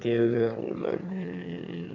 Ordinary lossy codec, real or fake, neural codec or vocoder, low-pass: none; fake; autoencoder, 22.05 kHz, a latent of 192 numbers a frame, VITS, trained on one speaker; 7.2 kHz